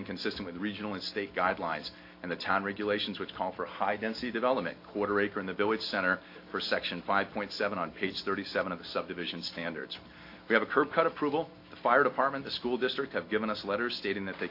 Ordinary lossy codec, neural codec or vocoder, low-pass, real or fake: AAC, 32 kbps; none; 5.4 kHz; real